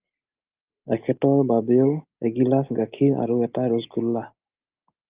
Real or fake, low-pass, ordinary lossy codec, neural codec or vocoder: real; 3.6 kHz; Opus, 24 kbps; none